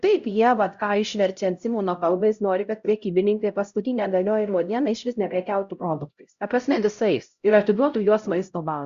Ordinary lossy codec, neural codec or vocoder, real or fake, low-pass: Opus, 64 kbps; codec, 16 kHz, 0.5 kbps, X-Codec, HuBERT features, trained on LibriSpeech; fake; 7.2 kHz